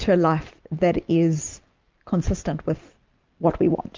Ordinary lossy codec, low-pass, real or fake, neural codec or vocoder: Opus, 24 kbps; 7.2 kHz; real; none